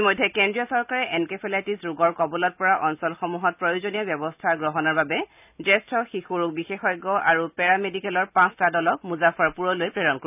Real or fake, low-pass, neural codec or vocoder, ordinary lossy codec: real; 3.6 kHz; none; none